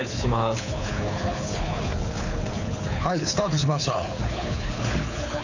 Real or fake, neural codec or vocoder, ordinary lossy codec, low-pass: fake; codec, 24 kHz, 6 kbps, HILCodec; none; 7.2 kHz